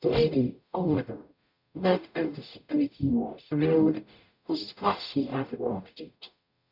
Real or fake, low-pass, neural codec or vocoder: fake; 5.4 kHz; codec, 44.1 kHz, 0.9 kbps, DAC